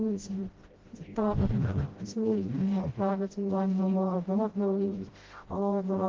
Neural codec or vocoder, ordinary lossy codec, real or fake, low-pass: codec, 16 kHz, 0.5 kbps, FreqCodec, smaller model; Opus, 16 kbps; fake; 7.2 kHz